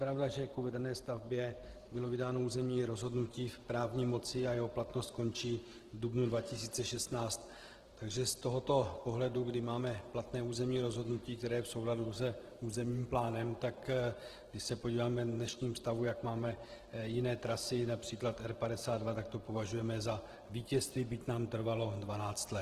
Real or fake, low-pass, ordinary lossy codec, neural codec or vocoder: fake; 14.4 kHz; Opus, 16 kbps; vocoder, 44.1 kHz, 128 mel bands every 512 samples, BigVGAN v2